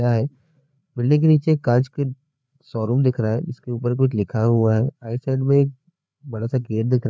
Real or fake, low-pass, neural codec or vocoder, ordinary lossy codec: fake; none; codec, 16 kHz, 4 kbps, FreqCodec, larger model; none